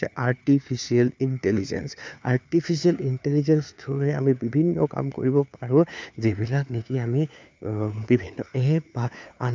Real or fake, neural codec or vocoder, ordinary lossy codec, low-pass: fake; codec, 16 kHz, 4 kbps, FunCodec, trained on LibriTTS, 50 frames a second; none; none